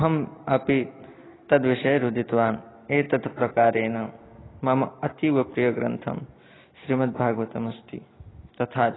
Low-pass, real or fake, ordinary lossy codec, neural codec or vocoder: 7.2 kHz; real; AAC, 16 kbps; none